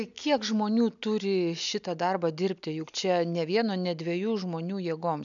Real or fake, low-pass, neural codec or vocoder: real; 7.2 kHz; none